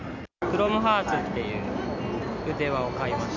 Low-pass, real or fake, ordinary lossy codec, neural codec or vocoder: 7.2 kHz; real; none; none